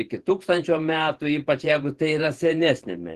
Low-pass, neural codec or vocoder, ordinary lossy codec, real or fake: 14.4 kHz; vocoder, 48 kHz, 128 mel bands, Vocos; Opus, 16 kbps; fake